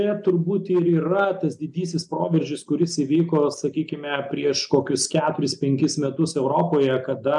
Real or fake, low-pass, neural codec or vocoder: real; 10.8 kHz; none